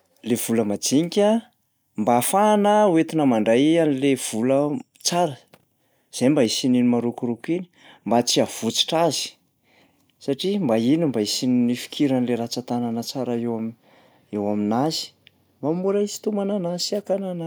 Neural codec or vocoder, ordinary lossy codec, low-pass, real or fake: none; none; none; real